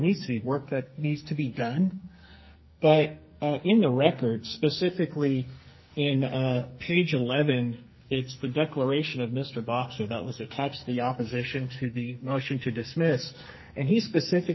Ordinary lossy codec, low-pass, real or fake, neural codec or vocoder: MP3, 24 kbps; 7.2 kHz; fake; codec, 44.1 kHz, 2.6 kbps, SNAC